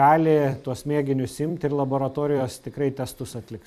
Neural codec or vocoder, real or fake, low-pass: vocoder, 44.1 kHz, 128 mel bands every 256 samples, BigVGAN v2; fake; 14.4 kHz